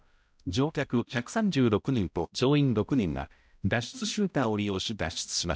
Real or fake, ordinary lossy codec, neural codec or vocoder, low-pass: fake; none; codec, 16 kHz, 0.5 kbps, X-Codec, HuBERT features, trained on balanced general audio; none